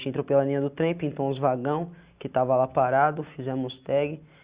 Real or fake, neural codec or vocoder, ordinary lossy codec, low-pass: real; none; Opus, 64 kbps; 3.6 kHz